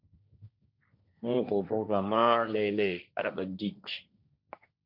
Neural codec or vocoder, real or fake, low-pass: codec, 16 kHz, 1.1 kbps, Voila-Tokenizer; fake; 5.4 kHz